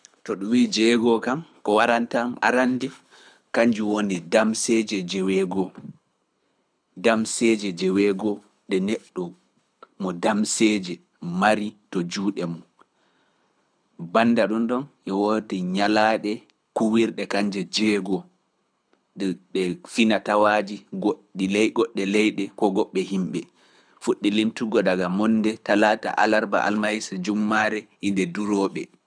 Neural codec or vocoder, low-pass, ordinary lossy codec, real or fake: codec, 24 kHz, 6 kbps, HILCodec; 9.9 kHz; none; fake